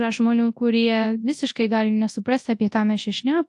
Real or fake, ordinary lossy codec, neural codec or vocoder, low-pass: fake; AAC, 64 kbps; codec, 24 kHz, 0.9 kbps, WavTokenizer, large speech release; 10.8 kHz